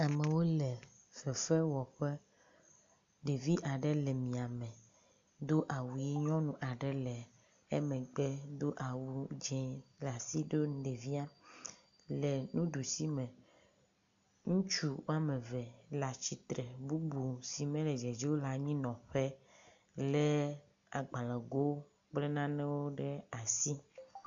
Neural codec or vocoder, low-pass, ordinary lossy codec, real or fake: none; 7.2 kHz; AAC, 64 kbps; real